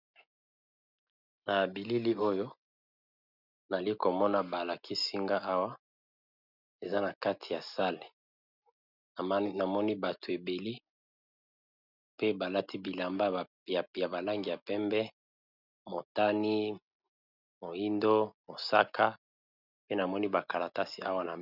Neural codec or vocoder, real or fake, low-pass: none; real; 5.4 kHz